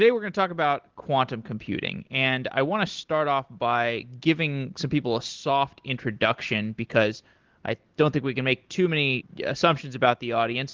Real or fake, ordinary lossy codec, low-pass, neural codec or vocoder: real; Opus, 16 kbps; 7.2 kHz; none